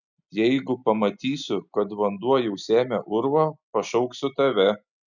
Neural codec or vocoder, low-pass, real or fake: none; 7.2 kHz; real